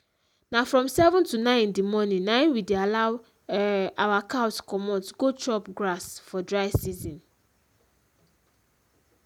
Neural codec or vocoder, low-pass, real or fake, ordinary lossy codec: none; 19.8 kHz; real; none